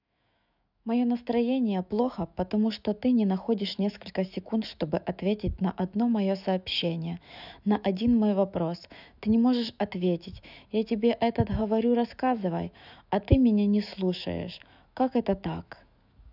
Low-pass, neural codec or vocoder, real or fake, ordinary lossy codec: 5.4 kHz; autoencoder, 48 kHz, 128 numbers a frame, DAC-VAE, trained on Japanese speech; fake; none